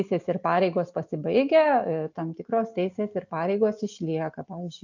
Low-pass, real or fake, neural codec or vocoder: 7.2 kHz; real; none